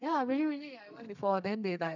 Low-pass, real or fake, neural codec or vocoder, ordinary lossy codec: 7.2 kHz; fake; codec, 32 kHz, 1.9 kbps, SNAC; none